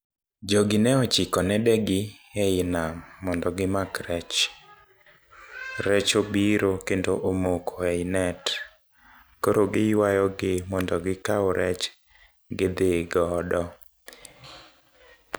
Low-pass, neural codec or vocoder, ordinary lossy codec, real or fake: none; none; none; real